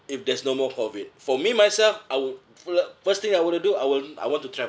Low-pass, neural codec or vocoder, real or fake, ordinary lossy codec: none; none; real; none